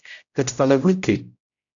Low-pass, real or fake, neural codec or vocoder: 7.2 kHz; fake; codec, 16 kHz, 0.5 kbps, X-Codec, HuBERT features, trained on general audio